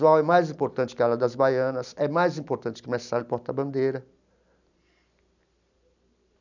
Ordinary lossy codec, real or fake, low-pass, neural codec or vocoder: none; real; 7.2 kHz; none